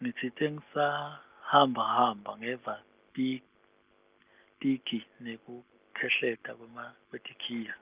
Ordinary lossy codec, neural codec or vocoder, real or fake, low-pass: Opus, 32 kbps; none; real; 3.6 kHz